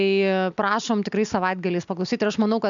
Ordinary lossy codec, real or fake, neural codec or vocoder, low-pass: MP3, 64 kbps; real; none; 7.2 kHz